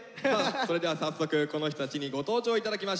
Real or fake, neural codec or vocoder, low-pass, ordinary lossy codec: real; none; none; none